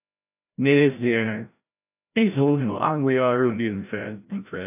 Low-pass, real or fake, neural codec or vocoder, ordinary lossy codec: 3.6 kHz; fake; codec, 16 kHz, 0.5 kbps, FreqCodec, larger model; AAC, 32 kbps